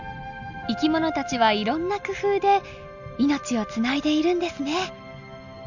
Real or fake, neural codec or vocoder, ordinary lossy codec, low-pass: real; none; none; 7.2 kHz